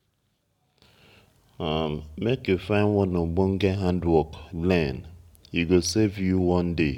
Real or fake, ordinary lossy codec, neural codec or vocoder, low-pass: real; none; none; 19.8 kHz